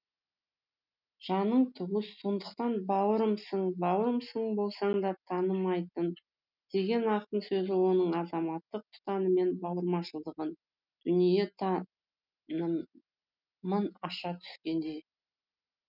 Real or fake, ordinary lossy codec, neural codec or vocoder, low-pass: real; none; none; 5.4 kHz